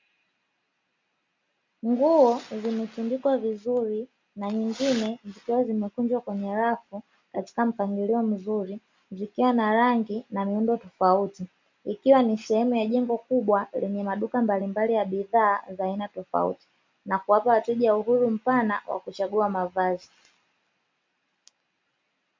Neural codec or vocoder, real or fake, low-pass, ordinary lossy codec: none; real; 7.2 kHz; MP3, 64 kbps